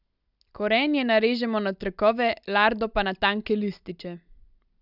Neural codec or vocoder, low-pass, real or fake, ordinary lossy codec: none; 5.4 kHz; real; none